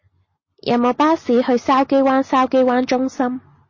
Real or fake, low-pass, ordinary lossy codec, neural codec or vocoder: real; 7.2 kHz; MP3, 32 kbps; none